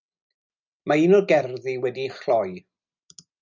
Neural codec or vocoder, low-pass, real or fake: none; 7.2 kHz; real